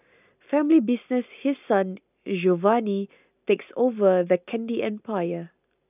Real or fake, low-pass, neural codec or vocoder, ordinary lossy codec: real; 3.6 kHz; none; none